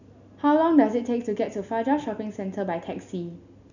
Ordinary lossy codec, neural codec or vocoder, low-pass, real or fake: none; none; 7.2 kHz; real